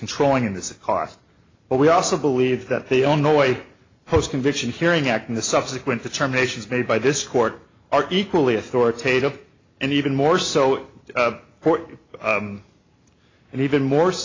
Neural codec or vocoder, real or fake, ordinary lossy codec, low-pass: none; real; AAC, 32 kbps; 7.2 kHz